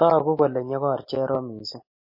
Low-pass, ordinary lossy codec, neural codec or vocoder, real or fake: 5.4 kHz; MP3, 24 kbps; none; real